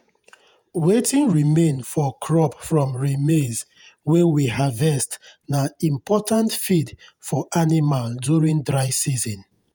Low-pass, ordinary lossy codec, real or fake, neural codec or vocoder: none; none; fake; vocoder, 48 kHz, 128 mel bands, Vocos